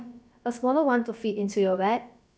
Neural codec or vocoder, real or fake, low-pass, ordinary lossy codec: codec, 16 kHz, about 1 kbps, DyCAST, with the encoder's durations; fake; none; none